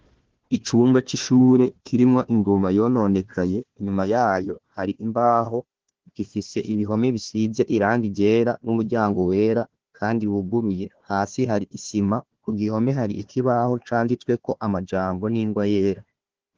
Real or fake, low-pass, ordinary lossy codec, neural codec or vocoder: fake; 7.2 kHz; Opus, 16 kbps; codec, 16 kHz, 1 kbps, FunCodec, trained on Chinese and English, 50 frames a second